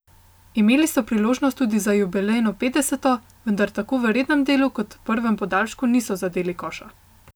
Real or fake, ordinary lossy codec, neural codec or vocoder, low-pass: real; none; none; none